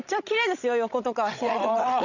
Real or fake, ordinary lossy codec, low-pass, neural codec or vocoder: fake; none; 7.2 kHz; codec, 16 kHz, 16 kbps, FreqCodec, larger model